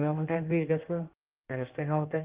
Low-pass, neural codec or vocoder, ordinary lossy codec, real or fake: 3.6 kHz; codec, 16 kHz in and 24 kHz out, 1.1 kbps, FireRedTTS-2 codec; Opus, 32 kbps; fake